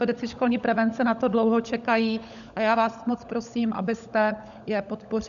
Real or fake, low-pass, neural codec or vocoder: fake; 7.2 kHz; codec, 16 kHz, 16 kbps, FunCodec, trained on LibriTTS, 50 frames a second